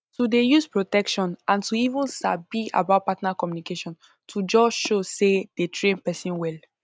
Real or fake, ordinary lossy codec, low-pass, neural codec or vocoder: real; none; none; none